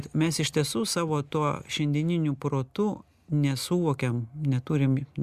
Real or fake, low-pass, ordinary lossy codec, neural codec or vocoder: real; 14.4 kHz; Opus, 64 kbps; none